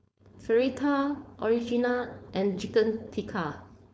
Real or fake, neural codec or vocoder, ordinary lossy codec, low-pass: fake; codec, 16 kHz, 4.8 kbps, FACodec; none; none